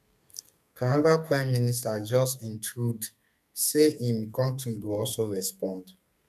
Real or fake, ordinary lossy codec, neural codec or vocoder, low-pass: fake; none; codec, 32 kHz, 1.9 kbps, SNAC; 14.4 kHz